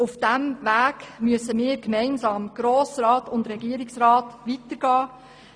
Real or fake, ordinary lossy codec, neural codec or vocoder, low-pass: real; none; none; 9.9 kHz